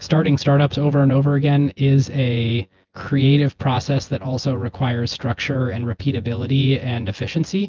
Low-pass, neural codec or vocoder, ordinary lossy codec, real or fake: 7.2 kHz; vocoder, 24 kHz, 100 mel bands, Vocos; Opus, 24 kbps; fake